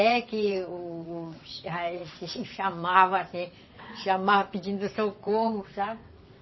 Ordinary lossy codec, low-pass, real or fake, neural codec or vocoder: MP3, 24 kbps; 7.2 kHz; fake; vocoder, 22.05 kHz, 80 mel bands, WaveNeXt